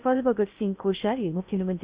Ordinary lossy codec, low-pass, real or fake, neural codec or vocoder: Opus, 64 kbps; 3.6 kHz; fake; codec, 16 kHz in and 24 kHz out, 0.6 kbps, FocalCodec, streaming, 4096 codes